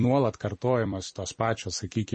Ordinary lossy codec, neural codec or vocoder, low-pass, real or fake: MP3, 32 kbps; vocoder, 44.1 kHz, 128 mel bands every 256 samples, BigVGAN v2; 10.8 kHz; fake